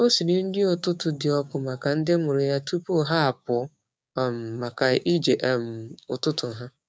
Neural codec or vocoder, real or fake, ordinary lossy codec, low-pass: codec, 16 kHz, 6 kbps, DAC; fake; none; none